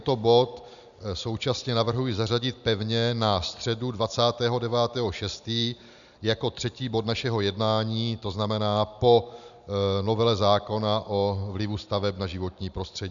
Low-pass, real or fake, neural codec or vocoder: 7.2 kHz; real; none